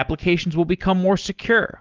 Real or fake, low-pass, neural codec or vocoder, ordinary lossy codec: real; 7.2 kHz; none; Opus, 16 kbps